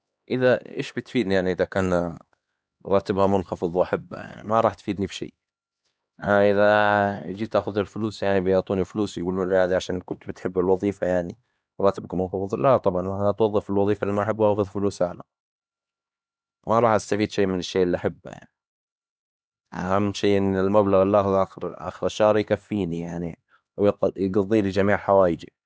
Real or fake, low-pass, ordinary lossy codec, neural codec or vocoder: fake; none; none; codec, 16 kHz, 2 kbps, X-Codec, HuBERT features, trained on LibriSpeech